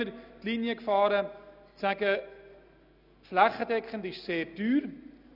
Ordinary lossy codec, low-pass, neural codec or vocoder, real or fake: none; 5.4 kHz; none; real